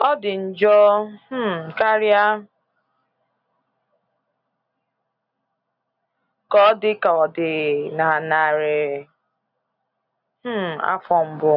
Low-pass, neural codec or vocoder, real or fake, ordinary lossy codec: 5.4 kHz; none; real; none